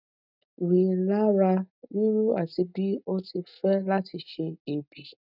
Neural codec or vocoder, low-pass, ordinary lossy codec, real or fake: none; 5.4 kHz; none; real